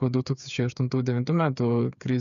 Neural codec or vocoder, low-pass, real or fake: codec, 16 kHz, 8 kbps, FreqCodec, smaller model; 7.2 kHz; fake